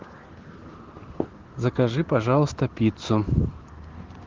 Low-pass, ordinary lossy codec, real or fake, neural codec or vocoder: 7.2 kHz; Opus, 16 kbps; real; none